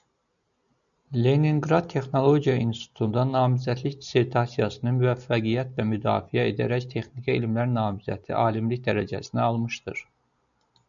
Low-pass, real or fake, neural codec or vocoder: 7.2 kHz; real; none